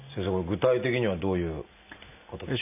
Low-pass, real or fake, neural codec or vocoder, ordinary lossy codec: 3.6 kHz; real; none; none